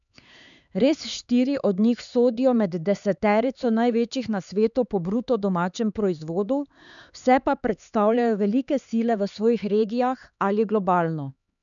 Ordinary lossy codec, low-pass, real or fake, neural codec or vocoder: none; 7.2 kHz; fake; codec, 16 kHz, 4 kbps, X-Codec, HuBERT features, trained on LibriSpeech